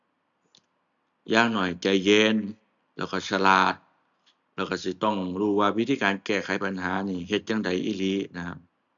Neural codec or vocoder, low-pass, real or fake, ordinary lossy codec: none; 7.2 kHz; real; none